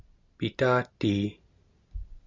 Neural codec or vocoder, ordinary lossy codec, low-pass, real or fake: none; Opus, 64 kbps; 7.2 kHz; real